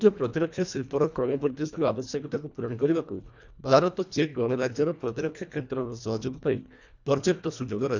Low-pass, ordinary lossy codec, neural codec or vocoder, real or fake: 7.2 kHz; none; codec, 24 kHz, 1.5 kbps, HILCodec; fake